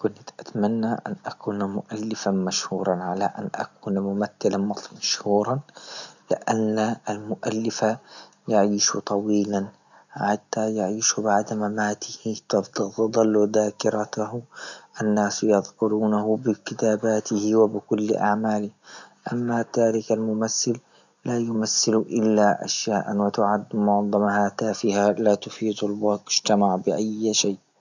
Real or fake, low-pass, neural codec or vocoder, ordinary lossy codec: real; 7.2 kHz; none; none